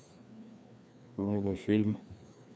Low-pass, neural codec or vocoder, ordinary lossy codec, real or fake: none; codec, 16 kHz, 2 kbps, FreqCodec, larger model; none; fake